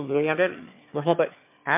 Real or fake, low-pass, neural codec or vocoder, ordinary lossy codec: fake; 3.6 kHz; autoencoder, 22.05 kHz, a latent of 192 numbers a frame, VITS, trained on one speaker; MP3, 32 kbps